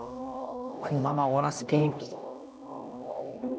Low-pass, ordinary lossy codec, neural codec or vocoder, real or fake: none; none; codec, 16 kHz, 1 kbps, X-Codec, HuBERT features, trained on LibriSpeech; fake